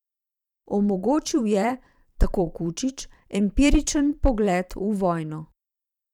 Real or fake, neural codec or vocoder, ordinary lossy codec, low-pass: fake; vocoder, 44.1 kHz, 128 mel bands every 512 samples, BigVGAN v2; none; 19.8 kHz